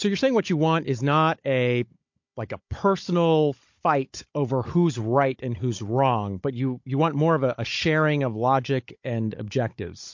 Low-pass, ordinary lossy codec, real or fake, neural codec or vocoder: 7.2 kHz; MP3, 48 kbps; fake; codec, 16 kHz, 16 kbps, FunCodec, trained on Chinese and English, 50 frames a second